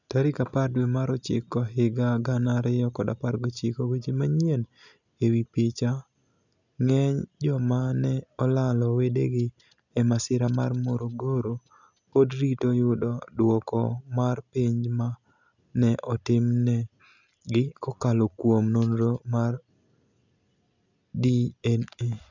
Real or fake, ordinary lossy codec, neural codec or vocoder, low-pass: real; none; none; 7.2 kHz